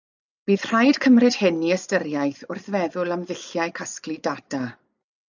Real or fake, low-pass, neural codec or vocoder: real; 7.2 kHz; none